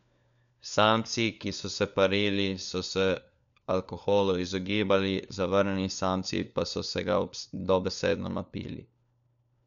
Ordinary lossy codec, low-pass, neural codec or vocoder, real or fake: none; 7.2 kHz; codec, 16 kHz, 4 kbps, FunCodec, trained on LibriTTS, 50 frames a second; fake